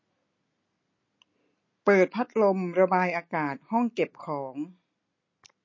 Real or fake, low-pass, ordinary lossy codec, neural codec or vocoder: real; 7.2 kHz; MP3, 32 kbps; none